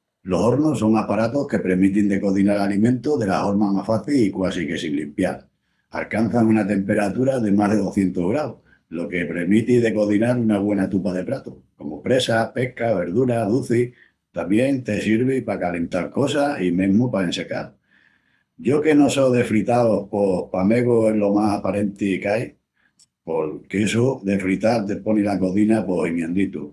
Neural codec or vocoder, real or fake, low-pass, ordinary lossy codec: codec, 24 kHz, 6 kbps, HILCodec; fake; none; none